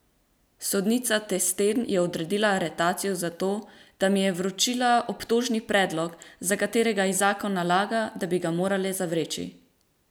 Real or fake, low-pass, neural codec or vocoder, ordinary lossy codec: real; none; none; none